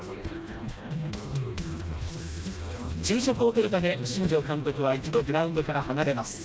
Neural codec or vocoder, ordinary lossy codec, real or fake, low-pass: codec, 16 kHz, 1 kbps, FreqCodec, smaller model; none; fake; none